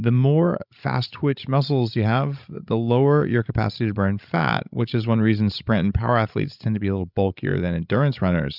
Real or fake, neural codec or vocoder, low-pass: fake; codec, 16 kHz, 16 kbps, FreqCodec, larger model; 5.4 kHz